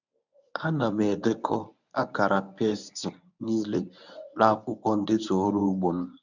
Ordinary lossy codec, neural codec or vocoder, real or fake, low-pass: none; codec, 24 kHz, 0.9 kbps, WavTokenizer, medium speech release version 1; fake; 7.2 kHz